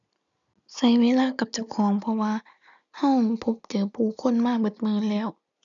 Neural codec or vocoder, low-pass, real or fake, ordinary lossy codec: none; 7.2 kHz; real; none